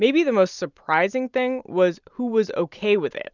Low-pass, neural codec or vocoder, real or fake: 7.2 kHz; none; real